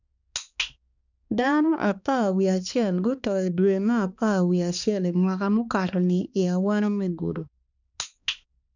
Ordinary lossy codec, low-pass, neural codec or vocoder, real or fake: none; 7.2 kHz; codec, 16 kHz, 2 kbps, X-Codec, HuBERT features, trained on balanced general audio; fake